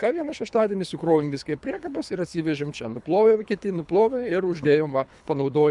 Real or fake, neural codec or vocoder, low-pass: fake; codec, 24 kHz, 3 kbps, HILCodec; 10.8 kHz